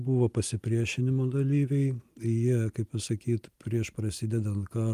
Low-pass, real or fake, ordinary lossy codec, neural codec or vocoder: 14.4 kHz; real; Opus, 32 kbps; none